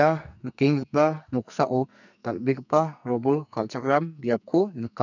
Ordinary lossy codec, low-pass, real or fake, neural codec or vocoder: none; 7.2 kHz; fake; codec, 44.1 kHz, 2.6 kbps, SNAC